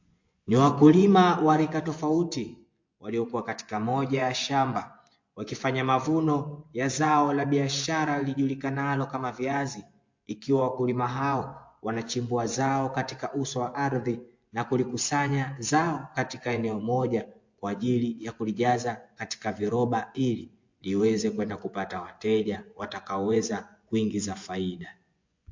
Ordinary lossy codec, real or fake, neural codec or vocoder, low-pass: MP3, 48 kbps; fake; vocoder, 24 kHz, 100 mel bands, Vocos; 7.2 kHz